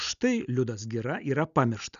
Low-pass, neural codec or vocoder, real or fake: 7.2 kHz; none; real